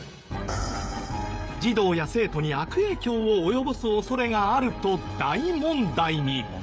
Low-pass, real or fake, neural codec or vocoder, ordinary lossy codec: none; fake; codec, 16 kHz, 16 kbps, FreqCodec, smaller model; none